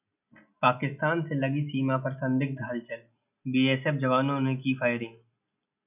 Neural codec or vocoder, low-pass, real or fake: none; 3.6 kHz; real